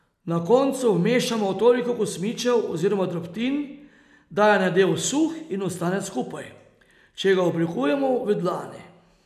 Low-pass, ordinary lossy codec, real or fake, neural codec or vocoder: 14.4 kHz; none; real; none